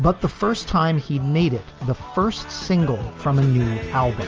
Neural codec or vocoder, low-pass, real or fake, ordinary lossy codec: none; 7.2 kHz; real; Opus, 24 kbps